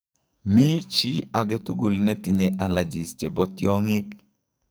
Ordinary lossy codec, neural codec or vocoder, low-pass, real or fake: none; codec, 44.1 kHz, 2.6 kbps, SNAC; none; fake